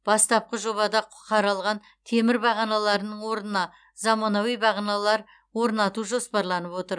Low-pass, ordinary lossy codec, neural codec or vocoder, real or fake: 9.9 kHz; none; none; real